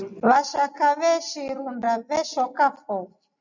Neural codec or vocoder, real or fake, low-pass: none; real; 7.2 kHz